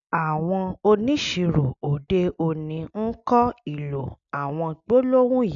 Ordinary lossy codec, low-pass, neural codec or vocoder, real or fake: none; 7.2 kHz; none; real